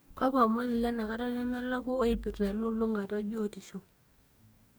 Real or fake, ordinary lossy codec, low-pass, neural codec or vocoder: fake; none; none; codec, 44.1 kHz, 2.6 kbps, DAC